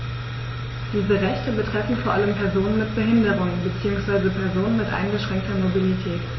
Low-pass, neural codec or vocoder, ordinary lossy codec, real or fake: 7.2 kHz; none; MP3, 24 kbps; real